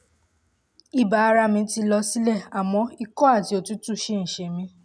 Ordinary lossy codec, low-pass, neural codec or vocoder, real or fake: none; none; none; real